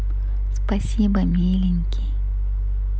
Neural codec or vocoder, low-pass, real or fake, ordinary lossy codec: none; none; real; none